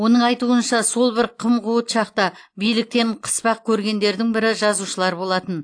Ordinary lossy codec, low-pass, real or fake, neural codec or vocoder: AAC, 48 kbps; 9.9 kHz; real; none